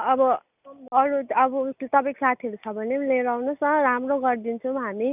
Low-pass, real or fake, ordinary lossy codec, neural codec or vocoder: 3.6 kHz; real; none; none